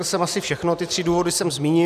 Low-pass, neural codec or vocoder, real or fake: 14.4 kHz; none; real